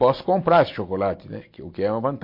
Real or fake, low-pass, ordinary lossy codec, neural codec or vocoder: real; 5.4 kHz; MP3, 32 kbps; none